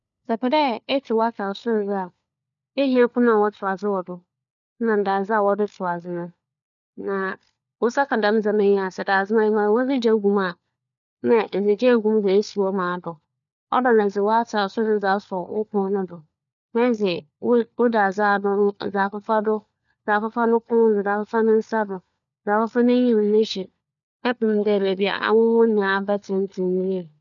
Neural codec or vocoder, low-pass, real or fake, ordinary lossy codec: codec, 16 kHz, 4 kbps, FunCodec, trained on LibriTTS, 50 frames a second; 7.2 kHz; fake; none